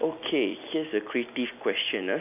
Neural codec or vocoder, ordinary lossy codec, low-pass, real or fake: none; none; 3.6 kHz; real